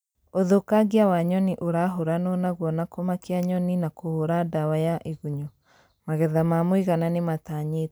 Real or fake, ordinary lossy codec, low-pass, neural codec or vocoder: real; none; none; none